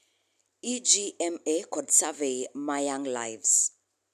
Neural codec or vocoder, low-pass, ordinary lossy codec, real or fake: none; 14.4 kHz; none; real